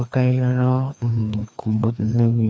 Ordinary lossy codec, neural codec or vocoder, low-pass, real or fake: none; codec, 16 kHz, 2 kbps, FreqCodec, larger model; none; fake